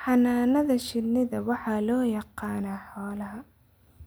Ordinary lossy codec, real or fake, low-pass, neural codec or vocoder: none; real; none; none